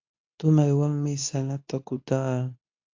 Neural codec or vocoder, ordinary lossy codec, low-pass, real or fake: codec, 24 kHz, 0.9 kbps, WavTokenizer, medium speech release version 2; AAC, 48 kbps; 7.2 kHz; fake